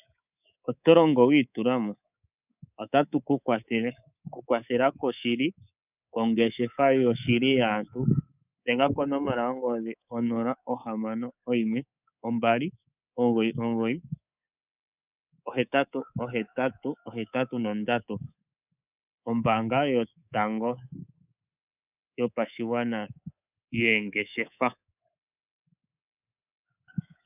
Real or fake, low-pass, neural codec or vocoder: fake; 3.6 kHz; codec, 24 kHz, 3.1 kbps, DualCodec